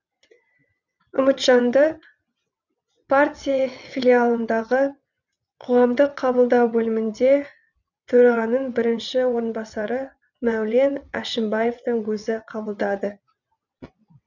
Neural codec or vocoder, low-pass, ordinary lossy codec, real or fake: vocoder, 22.05 kHz, 80 mel bands, WaveNeXt; 7.2 kHz; none; fake